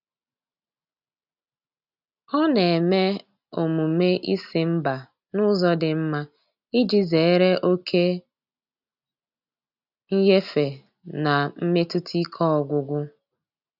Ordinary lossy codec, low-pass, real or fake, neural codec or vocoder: none; 5.4 kHz; real; none